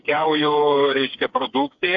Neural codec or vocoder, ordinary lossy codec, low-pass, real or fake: codec, 16 kHz, 4 kbps, FreqCodec, smaller model; AAC, 64 kbps; 7.2 kHz; fake